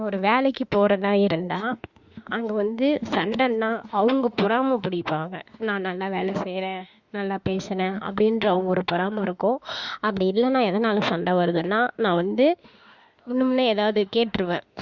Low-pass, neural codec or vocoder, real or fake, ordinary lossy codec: 7.2 kHz; autoencoder, 48 kHz, 32 numbers a frame, DAC-VAE, trained on Japanese speech; fake; Opus, 64 kbps